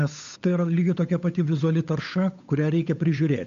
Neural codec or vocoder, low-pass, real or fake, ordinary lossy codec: codec, 16 kHz, 8 kbps, FunCodec, trained on Chinese and English, 25 frames a second; 7.2 kHz; fake; MP3, 64 kbps